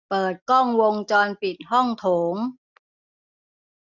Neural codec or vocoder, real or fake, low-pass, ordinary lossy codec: none; real; 7.2 kHz; none